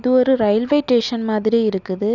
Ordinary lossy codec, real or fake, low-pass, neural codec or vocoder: none; real; 7.2 kHz; none